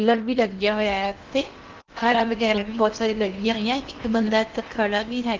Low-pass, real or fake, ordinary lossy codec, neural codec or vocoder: 7.2 kHz; fake; Opus, 32 kbps; codec, 16 kHz in and 24 kHz out, 0.8 kbps, FocalCodec, streaming, 65536 codes